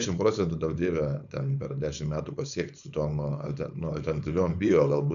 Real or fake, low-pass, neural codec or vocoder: fake; 7.2 kHz; codec, 16 kHz, 4.8 kbps, FACodec